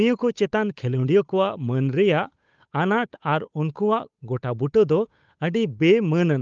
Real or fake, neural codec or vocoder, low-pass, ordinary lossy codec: fake; codec, 16 kHz, 8 kbps, FunCodec, trained on Chinese and English, 25 frames a second; 7.2 kHz; Opus, 32 kbps